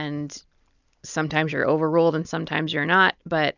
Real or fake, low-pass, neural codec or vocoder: real; 7.2 kHz; none